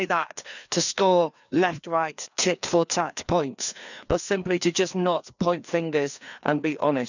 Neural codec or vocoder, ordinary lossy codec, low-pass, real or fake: codec, 16 kHz, 2 kbps, FreqCodec, larger model; none; 7.2 kHz; fake